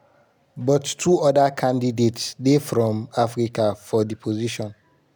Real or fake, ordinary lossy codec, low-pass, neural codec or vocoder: real; none; none; none